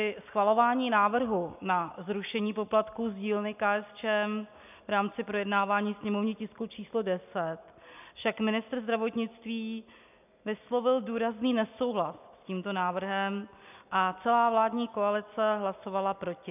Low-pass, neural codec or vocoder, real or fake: 3.6 kHz; none; real